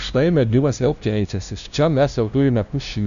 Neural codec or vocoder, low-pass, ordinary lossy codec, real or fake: codec, 16 kHz, 0.5 kbps, FunCodec, trained on LibriTTS, 25 frames a second; 7.2 kHz; MP3, 96 kbps; fake